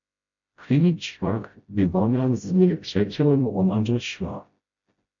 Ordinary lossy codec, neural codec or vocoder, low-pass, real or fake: MP3, 48 kbps; codec, 16 kHz, 0.5 kbps, FreqCodec, smaller model; 7.2 kHz; fake